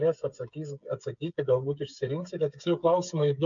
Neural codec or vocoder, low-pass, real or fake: codec, 16 kHz, 8 kbps, FreqCodec, smaller model; 7.2 kHz; fake